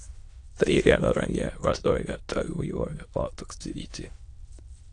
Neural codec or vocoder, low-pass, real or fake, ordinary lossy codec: autoencoder, 22.05 kHz, a latent of 192 numbers a frame, VITS, trained on many speakers; 9.9 kHz; fake; AAC, 48 kbps